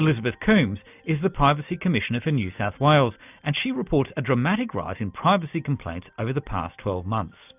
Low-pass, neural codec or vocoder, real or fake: 3.6 kHz; none; real